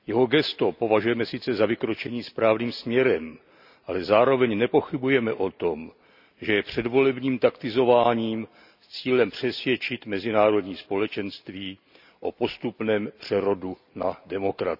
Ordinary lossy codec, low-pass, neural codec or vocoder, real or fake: none; 5.4 kHz; none; real